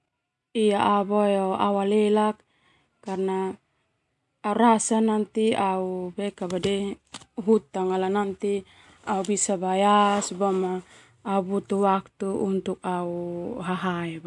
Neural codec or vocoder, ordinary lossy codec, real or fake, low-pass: none; MP3, 64 kbps; real; 10.8 kHz